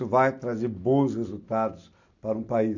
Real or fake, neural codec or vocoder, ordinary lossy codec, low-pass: real; none; none; 7.2 kHz